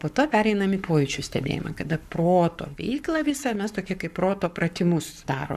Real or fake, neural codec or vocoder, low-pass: fake; codec, 44.1 kHz, 7.8 kbps, Pupu-Codec; 14.4 kHz